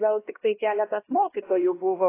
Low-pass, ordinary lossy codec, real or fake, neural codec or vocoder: 3.6 kHz; AAC, 16 kbps; fake; codec, 16 kHz, 1 kbps, X-Codec, HuBERT features, trained on LibriSpeech